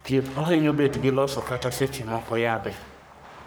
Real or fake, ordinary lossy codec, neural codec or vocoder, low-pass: fake; none; codec, 44.1 kHz, 3.4 kbps, Pupu-Codec; none